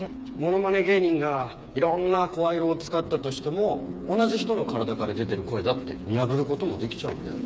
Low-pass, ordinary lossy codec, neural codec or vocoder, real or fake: none; none; codec, 16 kHz, 4 kbps, FreqCodec, smaller model; fake